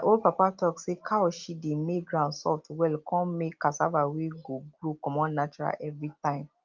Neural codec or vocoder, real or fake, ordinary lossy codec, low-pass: none; real; Opus, 24 kbps; 7.2 kHz